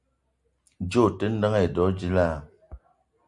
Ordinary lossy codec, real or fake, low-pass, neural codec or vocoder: Opus, 64 kbps; real; 10.8 kHz; none